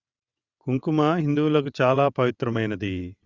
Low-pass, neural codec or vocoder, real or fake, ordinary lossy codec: 7.2 kHz; vocoder, 22.05 kHz, 80 mel bands, WaveNeXt; fake; Opus, 64 kbps